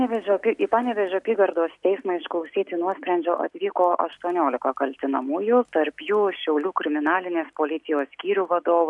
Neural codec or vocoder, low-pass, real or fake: none; 10.8 kHz; real